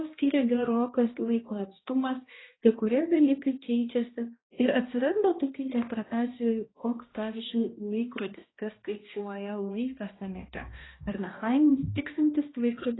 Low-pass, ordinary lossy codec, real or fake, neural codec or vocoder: 7.2 kHz; AAC, 16 kbps; fake; codec, 16 kHz, 1 kbps, X-Codec, HuBERT features, trained on balanced general audio